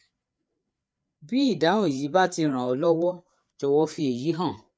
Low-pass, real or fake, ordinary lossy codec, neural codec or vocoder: none; fake; none; codec, 16 kHz, 4 kbps, FreqCodec, larger model